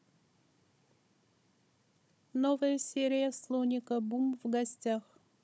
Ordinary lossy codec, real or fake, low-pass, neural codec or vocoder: none; fake; none; codec, 16 kHz, 16 kbps, FunCodec, trained on Chinese and English, 50 frames a second